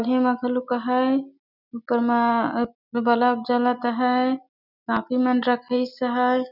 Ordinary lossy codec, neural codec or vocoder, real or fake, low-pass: none; none; real; 5.4 kHz